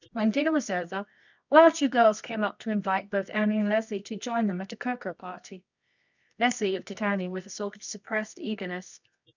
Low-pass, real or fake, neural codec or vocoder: 7.2 kHz; fake; codec, 24 kHz, 0.9 kbps, WavTokenizer, medium music audio release